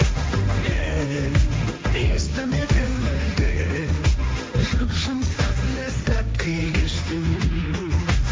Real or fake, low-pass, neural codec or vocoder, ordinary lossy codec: fake; none; codec, 16 kHz, 1.1 kbps, Voila-Tokenizer; none